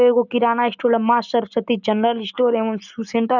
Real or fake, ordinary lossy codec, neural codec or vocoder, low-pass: real; none; none; 7.2 kHz